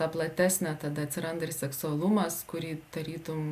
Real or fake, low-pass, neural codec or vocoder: real; 14.4 kHz; none